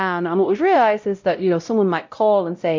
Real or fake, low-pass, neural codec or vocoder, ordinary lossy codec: fake; 7.2 kHz; codec, 16 kHz, 0.5 kbps, X-Codec, WavLM features, trained on Multilingual LibriSpeech; MP3, 64 kbps